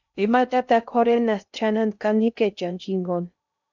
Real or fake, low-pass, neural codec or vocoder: fake; 7.2 kHz; codec, 16 kHz in and 24 kHz out, 0.6 kbps, FocalCodec, streaming, 2048 codes